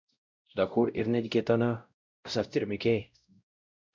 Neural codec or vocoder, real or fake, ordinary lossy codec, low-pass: codec, 16 kHz, 0.5 kbps, X-Codec, WavLM features, trained on Multilingual LibriSpeech; fake; MP3, 64 kbps; 7.2 kHz